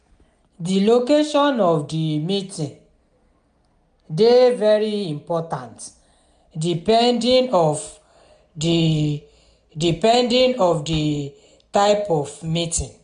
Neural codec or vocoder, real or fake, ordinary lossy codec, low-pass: none; real; none; 9.9 kHz